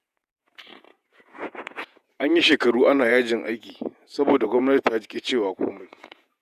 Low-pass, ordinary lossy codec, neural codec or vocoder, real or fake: 14.4 kHz; none; vocoder, 48 kHz, 128 mel bands, Vocos; fake